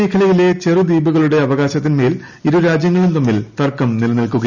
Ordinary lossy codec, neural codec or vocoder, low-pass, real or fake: none; none; 7.2 kHz; real